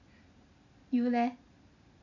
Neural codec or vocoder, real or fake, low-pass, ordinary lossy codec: none; real; 7.2 kHz; none